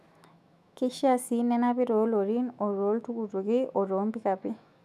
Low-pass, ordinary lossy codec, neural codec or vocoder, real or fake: 14.4 kHz; none; autoencoder, 48 kHz, 128 numbers a frame, DAC-VAE, trained on Japanese speech; fake